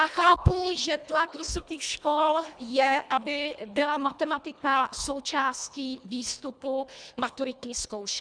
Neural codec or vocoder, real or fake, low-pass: codec, 24 kHz, 1.5 kbps, HILCodec; fake; 9.9 kHz